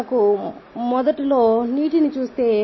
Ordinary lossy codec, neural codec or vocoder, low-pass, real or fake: MP3, 24 kbps; none; 7.2 kHz; real